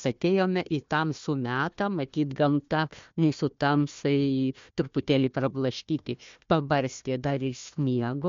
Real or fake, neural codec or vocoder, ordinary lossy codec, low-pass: fake; codec, 16 kHz, 1 kbps, FunCodec, trained on Chinese and English, 50 frames a second; MP3, 48 kbps; 7.2 kHz